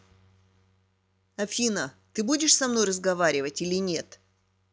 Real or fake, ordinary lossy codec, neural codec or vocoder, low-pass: real; none; none; none